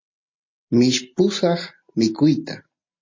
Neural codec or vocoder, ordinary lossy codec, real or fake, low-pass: none; MP3, 32 kbps; real; 7.2 kHz